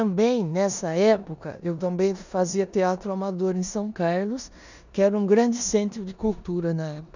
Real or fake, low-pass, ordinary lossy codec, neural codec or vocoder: fake; 7.2 kHz; none; codec, 16 kHz in and 24 kHz out, 0.9 kbps, LongCat-Audio-Codec, four codebook decoder